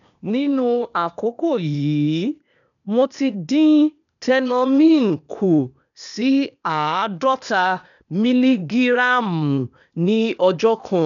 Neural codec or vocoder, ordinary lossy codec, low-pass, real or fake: codec, 16 kHz, 0.8 kbps, ZipCodec; none; 7.2 kHz; fake